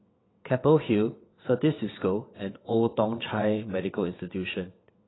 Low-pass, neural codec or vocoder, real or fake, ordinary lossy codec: 7.2 kHz; vocoder, 22.05 kHz, 80 mel bands, WaveNeXt; fake; AAC, 16 kbps